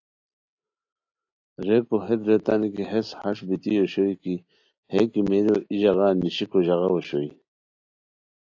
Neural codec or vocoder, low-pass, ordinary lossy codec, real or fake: none; 7.2 kHz; AAC, 48 kbps; real